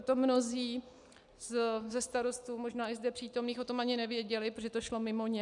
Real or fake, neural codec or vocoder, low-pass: real; none; 10.8 kHz